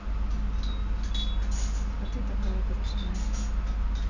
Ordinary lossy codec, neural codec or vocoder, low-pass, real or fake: none; none; 7.2 kHz; real